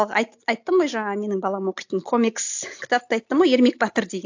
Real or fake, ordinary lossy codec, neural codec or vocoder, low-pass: real; AAC, 48 kbps; none; 7.2 kHz